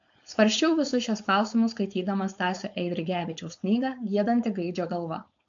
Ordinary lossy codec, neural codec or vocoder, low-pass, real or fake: MP3, 64 kbps; codec, 16 kHz, 4.8 kbps, FACodec; 7.2 kHz; fake